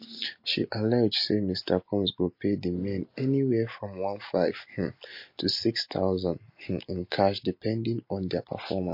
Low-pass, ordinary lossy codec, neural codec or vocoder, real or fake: 5.4 kHz; MP3, 32 kbps; none; real